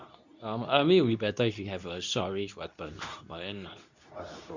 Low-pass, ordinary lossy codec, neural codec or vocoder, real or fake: 7.2 kHz; none; codec, 24 kHz, 0.9 kbps, WavTokenizer, medium speech release version 2; fake